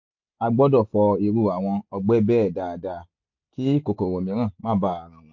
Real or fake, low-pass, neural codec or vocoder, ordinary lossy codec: real; 7.2 kHz; none; MP3, 48 kbps